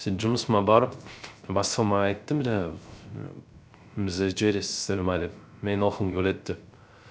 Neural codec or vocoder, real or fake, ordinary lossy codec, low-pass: codec, 16 kHz, 0.3 kbps, FocalCodec; fake; none; none